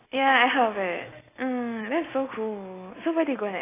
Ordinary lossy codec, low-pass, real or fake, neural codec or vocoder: AAC, 16 kbps; 3.6 kHz; real; none